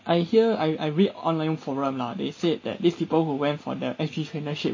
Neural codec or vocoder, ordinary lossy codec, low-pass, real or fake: none; MP3, 32 kbps; 7.2 kHz; real